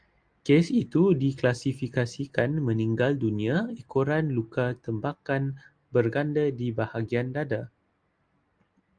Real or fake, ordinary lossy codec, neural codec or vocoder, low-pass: real; Opus, 32 kbps; none; 9.9 kHz